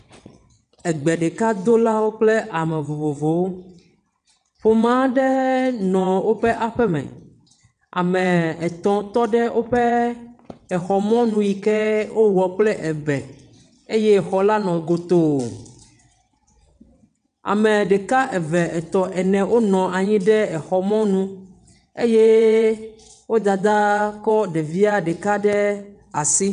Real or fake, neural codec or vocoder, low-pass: fake; vocoder, 22.05 kHz, 80 mel bands, WaveNeXt; 9.9 kHz